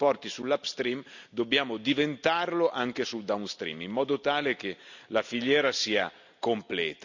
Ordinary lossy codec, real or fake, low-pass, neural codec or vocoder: none; real; 7.2 kHz; none